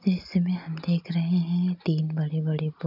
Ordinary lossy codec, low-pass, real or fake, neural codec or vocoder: none; 5.4 kHz; real; none